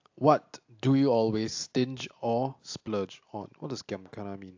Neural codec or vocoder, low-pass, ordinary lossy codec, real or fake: none; 7.2 kHz; AAC, 48 kbps; real